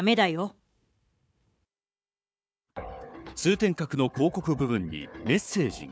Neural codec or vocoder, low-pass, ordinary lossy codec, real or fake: codec, 16 kHz, 4 kbps, FunCodec, trained on Chinese and English, 50 frames a second; none; none; fake